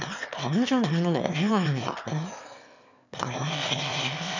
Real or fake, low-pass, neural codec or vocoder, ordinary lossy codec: fake; 7.2 kHz; autoencoder, 22.05 kHz, a latent of 192 numbers a frame, VITS, trained on one speaker; none